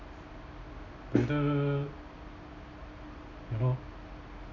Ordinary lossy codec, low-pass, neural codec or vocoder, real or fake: none; 7.2 kHz; none; real